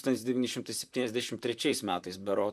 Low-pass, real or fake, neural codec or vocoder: 14.4 kHz; fake; vocoder, 44.1 kHz, 128 mel bands, Pupu-Vocoder